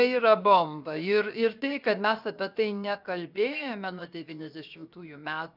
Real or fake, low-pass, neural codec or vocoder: fake; 5.4 kHz; codec, 16 kHz, 0.7 kbps, FocalCodec